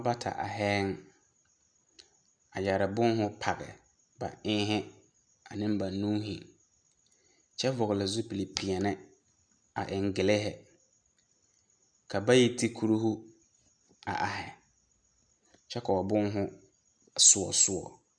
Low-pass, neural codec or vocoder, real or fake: 9.9 kHz; none; real